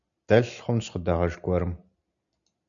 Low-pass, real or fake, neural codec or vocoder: 7.2 kHz; real; none